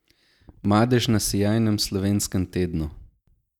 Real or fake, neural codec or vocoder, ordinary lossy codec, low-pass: real; none; none; 19.8 kHz